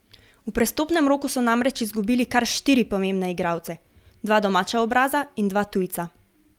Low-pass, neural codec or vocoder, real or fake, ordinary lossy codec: 19.8 kHz; none; real; Opus, 32 kbps